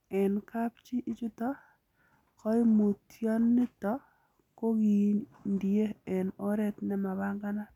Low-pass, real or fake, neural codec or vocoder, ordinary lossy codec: 19.8 kHz; real; none; none